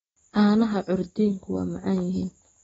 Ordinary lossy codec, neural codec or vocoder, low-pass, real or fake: AAC, 24 kbps; none; 10.8 kHz; real